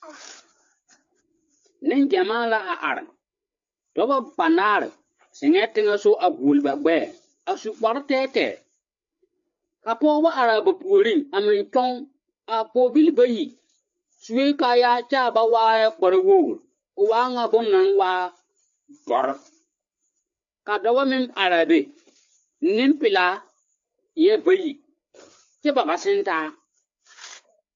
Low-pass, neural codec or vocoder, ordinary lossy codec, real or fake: 7.2 kHz; codec, 16 kHz, 4 kbps, FreqCodec, larger model; MP3, 48 kbps; fake